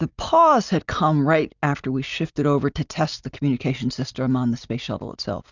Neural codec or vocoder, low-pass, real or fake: none; 7.2 kHz; real